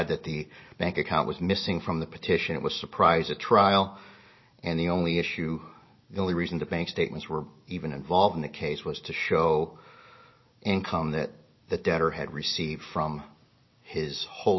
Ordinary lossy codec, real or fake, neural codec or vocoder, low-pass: MP3, 24 kbps; real; none; 7.2 kHz